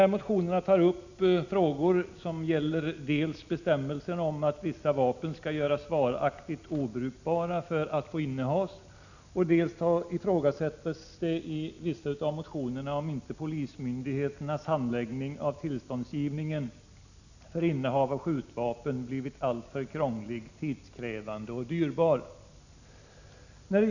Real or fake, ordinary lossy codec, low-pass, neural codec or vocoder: real; none; 7.2 kHz; none